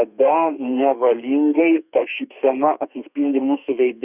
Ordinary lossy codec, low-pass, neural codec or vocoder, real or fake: Opus, 64 kbps; 3.6 kHz; codec, 32 kHz, 1.9 kbps, SNAC; fake